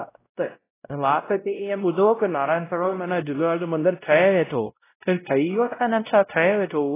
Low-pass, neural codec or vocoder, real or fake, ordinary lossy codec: 3.6 kHz; codec, 16 kHz, 0.5 kbps, X-Codec, WavLM features, trained on Multilingual LibriSpeech; fake; AAC, 16 kbps